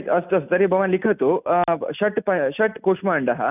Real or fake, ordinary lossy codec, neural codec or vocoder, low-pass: real; none; none; 3.6 kHz